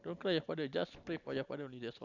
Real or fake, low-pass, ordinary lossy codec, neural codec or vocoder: real; 7.2 kHz; none; none